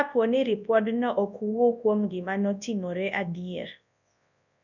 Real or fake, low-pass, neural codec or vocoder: fake; 7.2 kHz; codec, 24 kHz, 0.9 kbps, WavTokenizer, large speech release